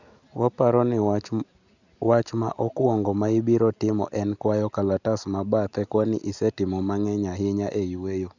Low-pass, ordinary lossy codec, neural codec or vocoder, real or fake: 7.2 kHz; none; none; real